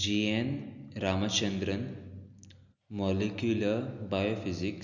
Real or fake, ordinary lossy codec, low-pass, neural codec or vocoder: real; none; 7.2 kHz; none